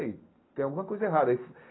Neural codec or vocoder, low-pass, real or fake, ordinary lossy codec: none; 7.2 kHz; real; AAC, 16 kbps